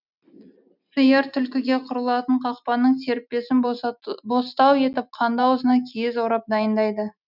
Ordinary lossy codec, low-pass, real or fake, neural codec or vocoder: none; 5.4 kHz; real; none